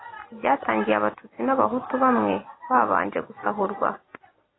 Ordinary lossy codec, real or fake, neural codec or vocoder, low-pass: AAC, 16 kbps; real; none; 7.2 kHz